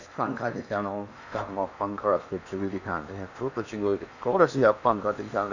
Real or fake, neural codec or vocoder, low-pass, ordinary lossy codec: fake; codec, 16 kHz in and 24 kHz out, 0.8 kbps, FocalCodec, streaming, 65536 codes; 7.2 kHz; none